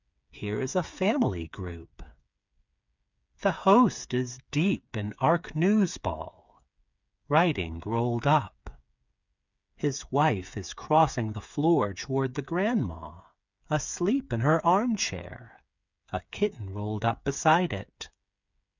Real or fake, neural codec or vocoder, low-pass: fake; codec, 16 kHz, 8 kbps, FreqCodec, smaller model; 7.2 kHz